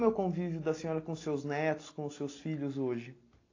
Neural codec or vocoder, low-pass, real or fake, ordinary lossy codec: none; 7.2 kHz; real; AAC, 32 kbps